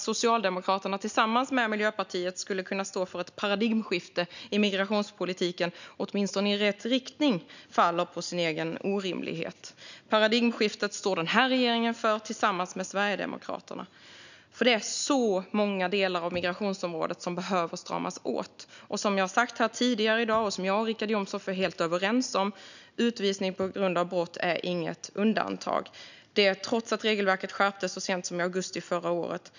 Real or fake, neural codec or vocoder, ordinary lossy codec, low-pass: real; none; none; 7.2 kHz